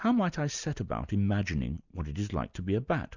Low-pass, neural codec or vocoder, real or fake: 7.2 kHz; none; real